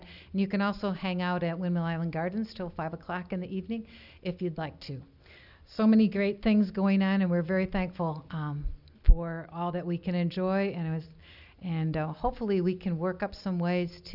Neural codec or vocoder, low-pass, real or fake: none; 5.4 kHz; real